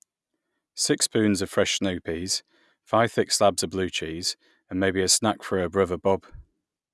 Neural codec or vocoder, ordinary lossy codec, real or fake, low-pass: none; none; real; none